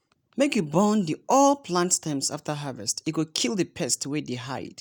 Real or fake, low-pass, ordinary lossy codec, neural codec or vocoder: real; none; none; none